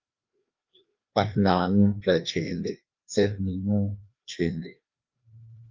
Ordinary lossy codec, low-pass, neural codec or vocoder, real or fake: Opus, 24 kbps; 7.2 kHz; codec, 16 kHz, 2 kbps, FreqCodec, larger model; fake